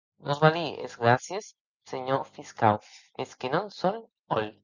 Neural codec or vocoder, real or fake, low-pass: none; real; 7.2 kHz